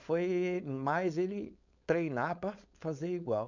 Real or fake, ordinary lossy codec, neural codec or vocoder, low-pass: fake; none; codec, 16 kHz, 4.8 kbps, FACodec; 7.2 kHz